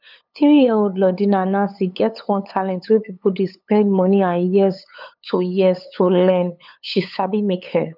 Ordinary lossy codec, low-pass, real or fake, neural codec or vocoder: none; 5.4 kHz; fake; codec, 16 kHz, 8 kbps, FunCodec, trained on LibriTTS, 25 frames a second